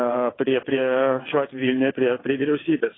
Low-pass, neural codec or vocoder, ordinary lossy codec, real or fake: 7.2 kHz; vocoder, 22.05 kHz, 80 mel bands, WaveNeXt; AAC, 16 kbps; fake